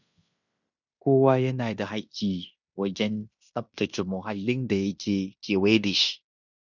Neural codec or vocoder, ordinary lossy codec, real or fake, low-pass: codec, 16 kHz in and 24 kHz out, 0.9 kbps, LongCat-Audio-Codec, fine tuned four codebook decoder; none; fake; 7.2 kHz